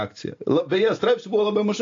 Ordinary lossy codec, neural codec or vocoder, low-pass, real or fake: AAC, 48 kbps; none; 7.2 kHz; real